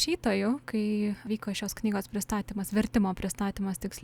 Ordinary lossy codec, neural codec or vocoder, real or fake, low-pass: Opus, 64 kbps; none; real; 19.8 kHz